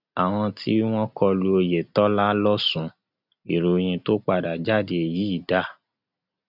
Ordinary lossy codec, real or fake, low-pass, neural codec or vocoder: none; real; 5.4 kHz; none